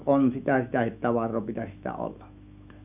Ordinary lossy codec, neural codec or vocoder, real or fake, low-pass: none; autoencoder, 48 kHz, 128 numbers a frame, DAC-VAE, trained on Japanese speech; fake; 3.6 kHz